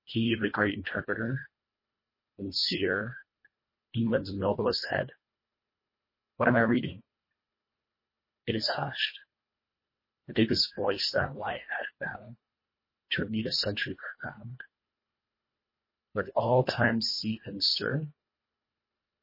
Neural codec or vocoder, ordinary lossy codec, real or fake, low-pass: codec, 24 kHz, 1.5 kbps, HILCodec; MP3, 24 kbps; fake; 5.4 kHz